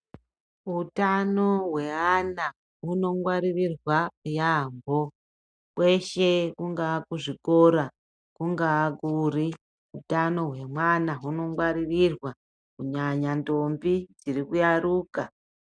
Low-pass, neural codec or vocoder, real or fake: 9.9 kHz; none; real